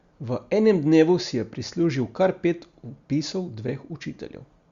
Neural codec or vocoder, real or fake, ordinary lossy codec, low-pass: none; real; Opus, 64 kbps; 7.2 kHz